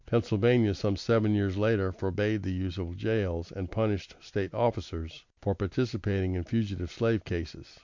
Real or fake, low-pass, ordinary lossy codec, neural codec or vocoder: real; 7.2 kHz; MP3, 64 kbps; none